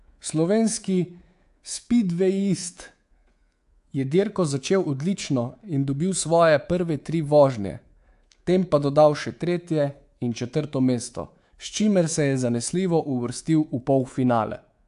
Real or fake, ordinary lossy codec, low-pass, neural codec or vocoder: fake; AAC, 64 kbps; 10.8 kHz; codec, 24 kHz, 3.1 kbps, DualCodec